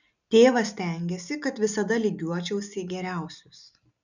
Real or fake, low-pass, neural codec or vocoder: real; 7.2 kHz; none